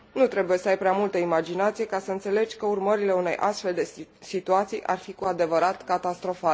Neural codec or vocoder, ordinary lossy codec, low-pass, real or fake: none; none; none; real